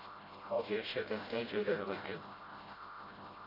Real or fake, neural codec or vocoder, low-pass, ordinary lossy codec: fake; codec, 16 kHz, 0.5 kbps, FreqCodec, smaller model; 5.4 kHz; AAC, 24 kbps